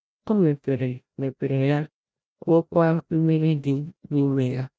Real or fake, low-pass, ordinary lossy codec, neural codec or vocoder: fake; none; none; codec, 16 kHz, 0.5 kbps, FreqCodec, larger model